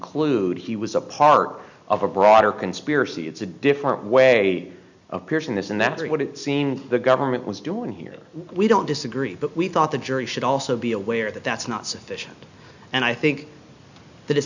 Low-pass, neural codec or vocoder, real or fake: 7.2 kHz; none; real